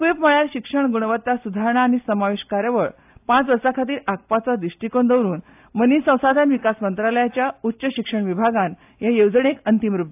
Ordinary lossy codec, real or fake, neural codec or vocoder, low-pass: AAC, 32 kbps; real; none; 3.6 kHz